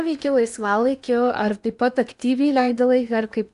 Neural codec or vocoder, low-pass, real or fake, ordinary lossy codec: codec, 16 kHz in and 24 kHz out, 0.8 kbps, FocalCodec, streaming, 65536 codes; 10.8 kHz; fake; AAC, 96 kbps